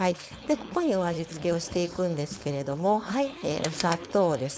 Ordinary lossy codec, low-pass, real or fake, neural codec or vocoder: none; none; fake; codec, 16 kHz, 4.8 kbps, FACodec